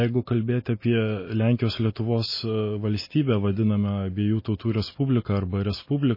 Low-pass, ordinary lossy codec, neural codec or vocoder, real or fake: 5.4 kHz; MP3, 24 kbps; none; real